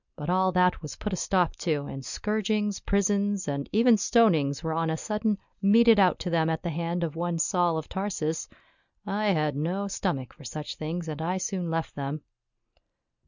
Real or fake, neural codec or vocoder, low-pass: real; none; 7.2 kHz